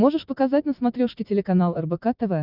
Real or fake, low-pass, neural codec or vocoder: real; 5.4 kHz; none